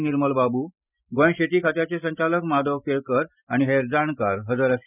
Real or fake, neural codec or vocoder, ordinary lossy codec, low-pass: real; none; none; 3.6 kHz